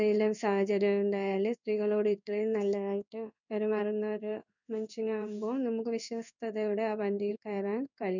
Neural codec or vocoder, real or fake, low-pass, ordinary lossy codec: codec, 16 kHz in and 24 kHz out, 1 kbps, XY-Tokenizer; fake; 7.2 kHz; none